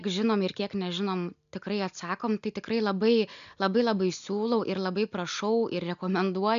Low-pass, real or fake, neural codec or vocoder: 7.2 kHz; real; none